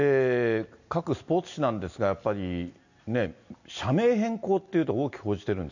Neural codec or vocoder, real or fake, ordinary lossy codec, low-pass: none; real; none; 7.2 kHz